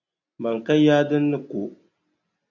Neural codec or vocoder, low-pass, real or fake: none; 7.2 kHz; real